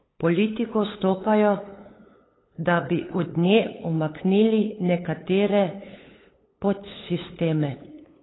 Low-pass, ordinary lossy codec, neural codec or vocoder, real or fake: 7.2 kHz; AAC, 16 kbps; codec, 16 kHz, 8 kbps, FunCodec, trained on LibriTTS, 25 frames a second; fake